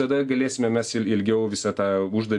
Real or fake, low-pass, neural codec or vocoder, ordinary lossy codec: real; 10.8 kHz; none; AAC, 64 kbps